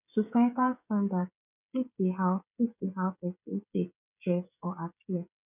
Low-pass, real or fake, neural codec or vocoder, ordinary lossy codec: 3.6 kHz; fake; codec, 16 kHz, 16 kbps, FreqCodec, smaller model; none